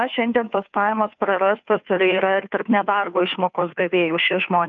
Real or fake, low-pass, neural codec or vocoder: fake; 7.2 kHz; codec, 16 kHz, 2 kbps, FunCodec, trained on Chinese and English, 25 frames a second